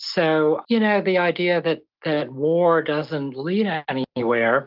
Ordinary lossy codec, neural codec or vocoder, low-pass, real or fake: Opus, 24 kbps; none; 5.4 kHz; real